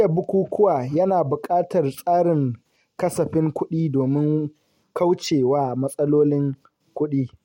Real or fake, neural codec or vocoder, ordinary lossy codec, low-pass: real; none; MP3, 64 kbps; 19.8 kHz